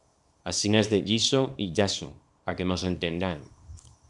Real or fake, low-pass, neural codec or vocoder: fake; 10.8 kHz; codec, 24 kHz, 0.9 kbps, WavTokenizer, small release